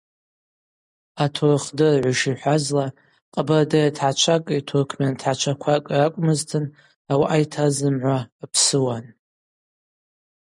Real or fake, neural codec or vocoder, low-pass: real; none; 10.8 kHz